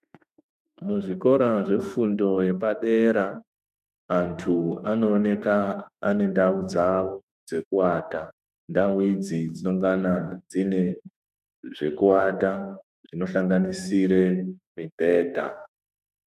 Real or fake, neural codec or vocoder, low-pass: fake; autoencoder, 48 kHz, 32 numbers a frame, DAC-VAE, trained on Japanese speech; 14.4 kHz